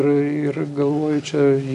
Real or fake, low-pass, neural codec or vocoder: real; 10.8 kHz; none